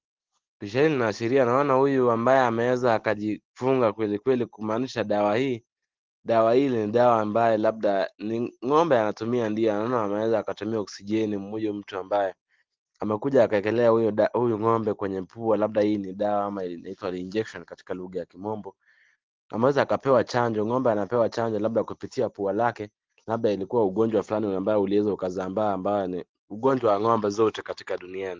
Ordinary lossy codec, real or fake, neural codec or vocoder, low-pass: Opus, 16 kbps; real; none; 7.2 kHz